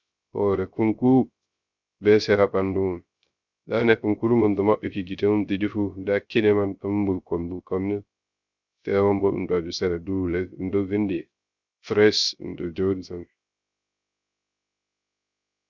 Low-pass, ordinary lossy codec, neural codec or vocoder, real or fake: 7.2 kHz; Opus, 64 kbps; codec, 16 kHz, 0.3 kbps, FocalCodec; fake